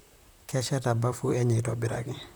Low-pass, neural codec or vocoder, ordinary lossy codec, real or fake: none; vocoder, 44.1 kHz, 128 mel bands, Pupu-Vocoder; none; fake